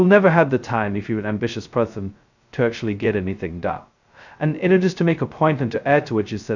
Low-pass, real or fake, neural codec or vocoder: 7.2 kHz; fake; codec, 16 kHz, 0.2 kbps, FocalCodec